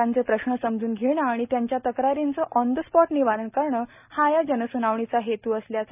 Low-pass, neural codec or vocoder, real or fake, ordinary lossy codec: 3.6 kHz; none; real; none